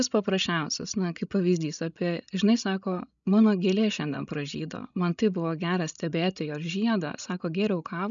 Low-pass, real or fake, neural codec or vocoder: 7.2 kHz; fake; codec, 16 kHz, 16 kbps, FreqCodec, larger model